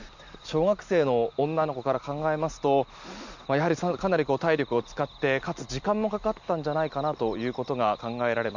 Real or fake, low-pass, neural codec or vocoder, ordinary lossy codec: real; 7.2 kHz; none; none